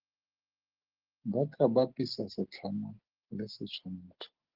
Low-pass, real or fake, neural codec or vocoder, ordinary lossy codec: 5.4 kHz; real; none; Opus, 16 kbps